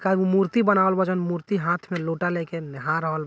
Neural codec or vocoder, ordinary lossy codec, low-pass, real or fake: none; none; none; real